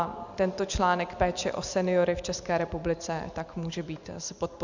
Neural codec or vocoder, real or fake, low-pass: none; real; 7.2 kHz